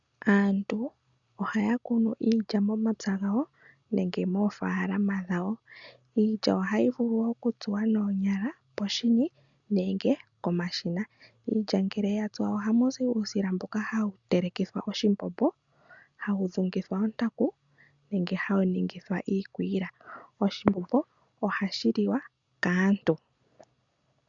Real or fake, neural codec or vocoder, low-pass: real; none; 7.2 kHz